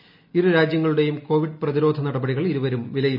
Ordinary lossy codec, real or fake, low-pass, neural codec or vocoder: none; real; 5.4 kHz; none